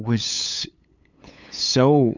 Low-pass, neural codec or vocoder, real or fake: 7.2 kHz; codec, 16 kHz, 8 kbps, FunCodec, trained on LibriTTS, 25 frames a second; fake